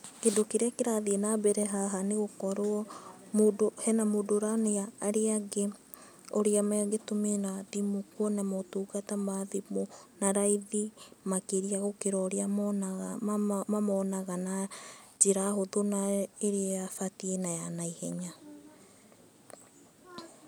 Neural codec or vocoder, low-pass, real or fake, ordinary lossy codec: none; none; real; none